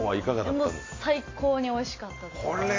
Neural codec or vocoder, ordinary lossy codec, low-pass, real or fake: none; AAC, 32 kbps; 7.2 kHz; real